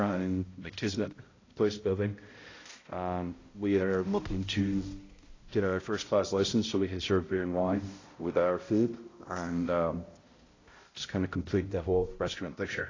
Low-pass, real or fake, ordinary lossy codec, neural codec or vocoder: 7.2 kHz; fake; AAC, 32 kbps; codec, 16 kHz, 0.5 kbps, X-Codec, HuBERT features, trained on balanced general audio